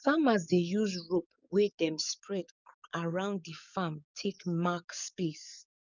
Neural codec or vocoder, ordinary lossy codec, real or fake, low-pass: codec, 44.1 kHz, 7.8 kbps, DAC; none; fake; 7.2 kHz